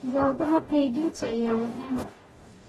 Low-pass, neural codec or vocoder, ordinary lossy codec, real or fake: 19.8 kHz; codec, 44.1 kHz, 0.9 kbps, DAC; AAC, 32 kbps; fake